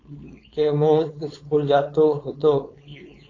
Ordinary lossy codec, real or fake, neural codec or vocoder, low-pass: AAC, 32 kbps; fake; codec, 16 kHz, 4.8 kbps, FACodec; 7.2 kHz